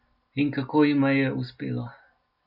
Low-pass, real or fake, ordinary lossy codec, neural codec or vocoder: 5.4 kHz; real; none; none